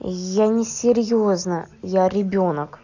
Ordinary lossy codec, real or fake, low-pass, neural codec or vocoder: none; real; 7.2 kHz; none